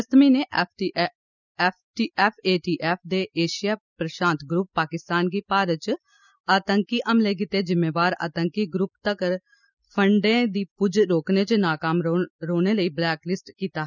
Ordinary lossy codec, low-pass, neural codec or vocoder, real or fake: none; 7.2 kHz; none; real